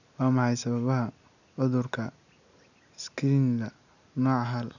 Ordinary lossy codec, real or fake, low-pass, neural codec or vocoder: none; real; 7.2 kHz; none